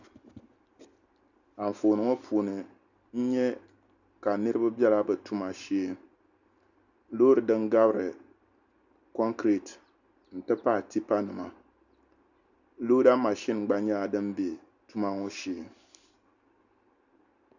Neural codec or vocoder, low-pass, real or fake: none; 7.2 kHz; real